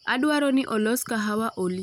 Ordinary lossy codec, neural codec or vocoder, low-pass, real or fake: none; none; 19.8 kHz; real